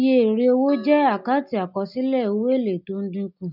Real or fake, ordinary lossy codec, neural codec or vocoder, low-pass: real; none; none; 5.4 kHz